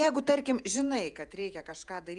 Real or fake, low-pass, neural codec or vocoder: fake; 10.8 kHz; vocoder, 48 kHz, 128 mel bands, Vocos